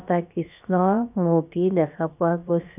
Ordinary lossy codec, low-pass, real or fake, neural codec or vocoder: none; 3.6 kHz; fake; codec, 16 kHz, about 1 kbps, DyCAST, with the encoder's durations